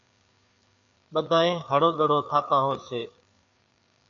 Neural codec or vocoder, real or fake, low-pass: codec, 16 kHz, 4 kbps, FreqCodec, larger model; fake; 7.2 kHz